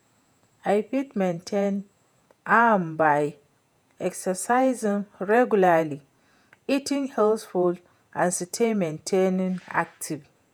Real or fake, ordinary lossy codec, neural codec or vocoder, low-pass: fake; none; vocoder, 48 kHz, 128 mel bands, Vocos; 19.8 kHz